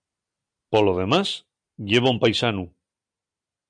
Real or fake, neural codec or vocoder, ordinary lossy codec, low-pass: real; none; MP3, 96 kbps; 9.9 kHz